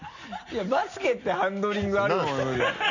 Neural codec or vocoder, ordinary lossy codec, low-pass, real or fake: none; none; 7.2 kHz; real